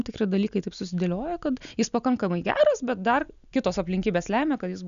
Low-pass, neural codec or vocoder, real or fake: 7.2 kHz; none; real